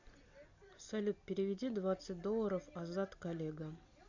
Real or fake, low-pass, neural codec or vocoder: real; 7.2 kHz; none